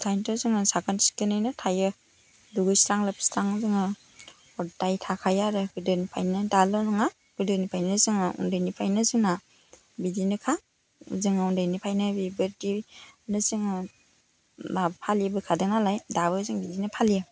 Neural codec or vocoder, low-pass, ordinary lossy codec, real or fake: none; none; none; real